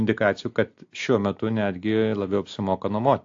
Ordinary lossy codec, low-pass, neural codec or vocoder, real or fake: AAC, 48 kbps; 7.2 kHz; none; real